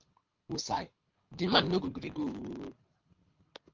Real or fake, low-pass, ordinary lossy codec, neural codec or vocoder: fake; 7.2 kHz; Opus, 32 kbps; codec, 24 kHz, 6 kbps, HILCodec